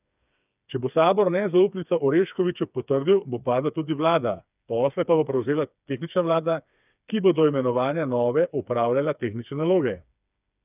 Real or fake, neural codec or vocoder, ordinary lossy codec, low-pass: fake; codec, 16 kHz, 4 kbps, FreqCodec, smaller model; none; 3.6 kHz